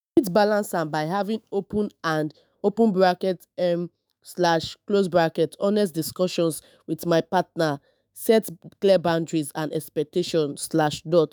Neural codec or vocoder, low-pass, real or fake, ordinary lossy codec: autoencoder, 48 kHz, 128 numbers a frame, DAC-VAE, trained on Japanese speech; none; fake; none